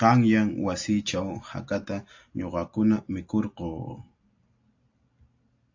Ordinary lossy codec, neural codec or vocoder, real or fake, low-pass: AAC, 48 kbps; none; real; 7.2 kHz